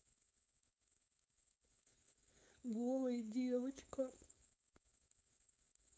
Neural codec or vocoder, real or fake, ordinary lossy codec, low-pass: codec, 16 kHz, 4.8 kbps, FACodec; fake; none; none